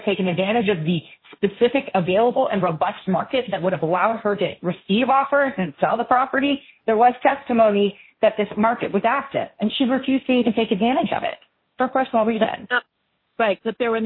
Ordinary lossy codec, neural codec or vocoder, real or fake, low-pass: MP3, 24 kbps; codec, 16 kHz, 1.1 kbps, Voila-Tokenizer; fake; 5.4 kHz